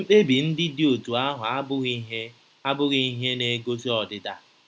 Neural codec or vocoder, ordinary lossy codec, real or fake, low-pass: none; none; real; none